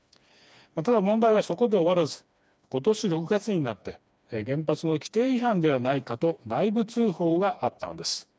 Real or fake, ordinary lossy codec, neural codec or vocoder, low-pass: fake; none; codec, 16 kHz, 2 kbps, FreqCodec, smaller model; none